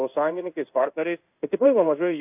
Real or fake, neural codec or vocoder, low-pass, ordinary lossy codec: fake; codec, 16 kHz in and 24 kHz out, 1 kbps, XY-Tokenizer; 3.6 kHz; AAC, 32 kbps